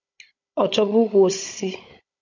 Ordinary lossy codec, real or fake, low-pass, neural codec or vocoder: MP3, 48 kbps; fake; 7.2 kHz; codec, 16 kHz, 16 kbps, FunCodec, trained on Chinese and English, 50 frames a second